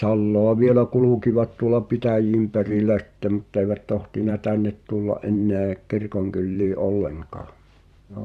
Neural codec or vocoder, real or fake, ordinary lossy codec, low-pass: vocoder, 44.1 kHz, 128 mel bands every 512 samples, BigVGAN v2; fake; Opus, 64 kbps; 14.4 kHz